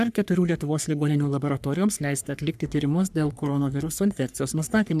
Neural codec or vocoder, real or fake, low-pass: codec, 44.1 kHz, 3.4 kbps, Pupu-Codec; fake; 14.4 kHz